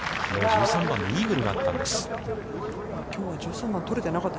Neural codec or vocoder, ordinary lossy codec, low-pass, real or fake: none; none; none; real